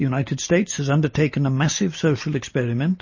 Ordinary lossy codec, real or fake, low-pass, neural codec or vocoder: MP3, 32 kbps; real; 7.2 kHz; none